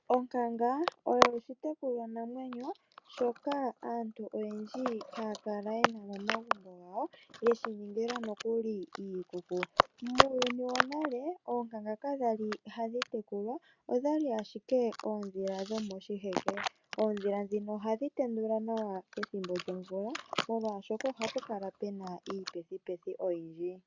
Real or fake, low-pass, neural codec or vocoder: real; 7.2 kHz; none